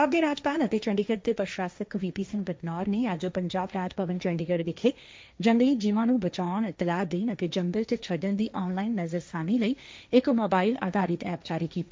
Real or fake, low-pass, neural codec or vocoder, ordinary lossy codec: fake; none; codec, 16 kHz, 1.1 kbps, Voila-Tokenizer; none